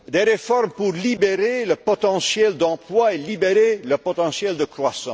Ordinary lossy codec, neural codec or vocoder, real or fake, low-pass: none; none; real; none